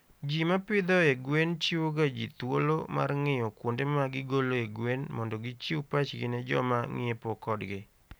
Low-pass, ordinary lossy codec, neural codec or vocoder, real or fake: none; none; none; real